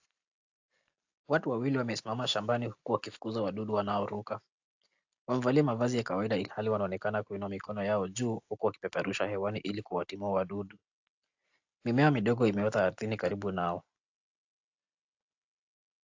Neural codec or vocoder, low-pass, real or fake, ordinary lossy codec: none; 7.2 kHz; real; AAC, 48 kbps